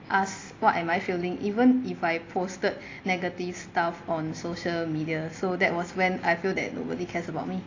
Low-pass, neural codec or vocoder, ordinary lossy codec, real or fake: 7.2 kHz; none; AAC, 32 kbps; real